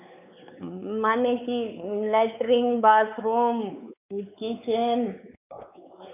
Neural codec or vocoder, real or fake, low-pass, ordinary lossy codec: codec, 16 kHz, 4 kbps, X-Codec, WavLM features, trained on Multilingual LibriSpeech; fake; 3.6 kHz; none